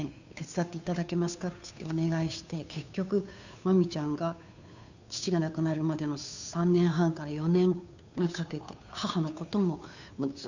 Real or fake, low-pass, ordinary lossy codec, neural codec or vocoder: fake; 7.2 kHz; none; codec, 16 kHz, 2 kbps, FunCodec, trained on Chinese and English, 25 frames a second